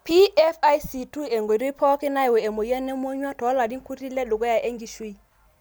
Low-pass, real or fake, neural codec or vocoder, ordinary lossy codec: none; real; none; none